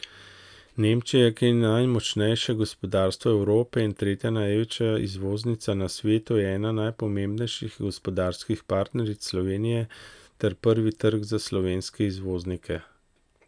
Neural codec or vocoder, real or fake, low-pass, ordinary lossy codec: none; real; 9.9 kHz; none